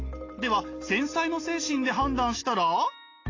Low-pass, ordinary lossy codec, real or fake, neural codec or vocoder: 7.2 kHz; AAC, 32 kbps; real; none